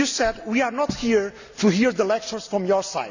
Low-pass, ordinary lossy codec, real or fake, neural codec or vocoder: 7.2 kHz; none; real; none